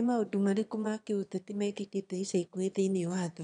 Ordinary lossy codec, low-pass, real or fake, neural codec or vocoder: none; 9.9 kHz; fake; autoencoder, 22.05 kHz, a latent of 192 numbers a frame, VITS, trained on one speaker